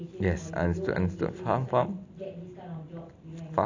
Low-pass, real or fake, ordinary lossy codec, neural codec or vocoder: 7.2 kHz; real; AAC, 48 kbps; none